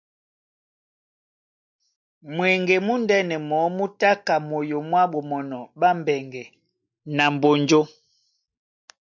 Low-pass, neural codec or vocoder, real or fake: 7.2 kHz; none; real